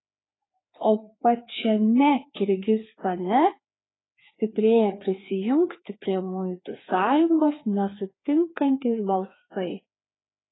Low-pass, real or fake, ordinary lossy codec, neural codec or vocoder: 7.2 kHz; fake; AAC, 16 kbps; codec, 16 kHz, 2 kbps, FreqCodec, larger model